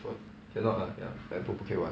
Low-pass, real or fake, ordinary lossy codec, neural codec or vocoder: none; real; none; none